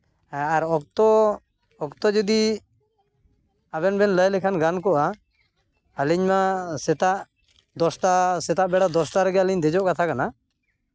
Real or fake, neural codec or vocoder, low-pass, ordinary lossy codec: real; none; none; none